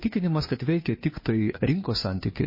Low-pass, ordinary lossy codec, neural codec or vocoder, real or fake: 5.4 kHz; MP3, 24 kbps; codec, 16 kHz, 2 kbps, FunCodec, trained on Chinese and English, 25 frames a second; fake